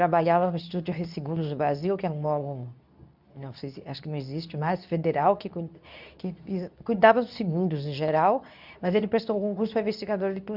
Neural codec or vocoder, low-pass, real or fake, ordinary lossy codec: codec, 24 kHz, 0.9 kbps, WavTokenizer, medium speech release version 2; 5.4 kHz; fake; none